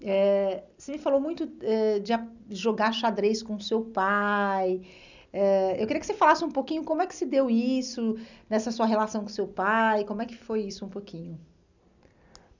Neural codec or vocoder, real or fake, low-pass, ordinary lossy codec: none; real; 7.2 kHz; none